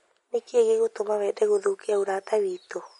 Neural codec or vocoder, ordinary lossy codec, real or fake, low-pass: none; MP3, 48 kbps; real; 19.8 kHz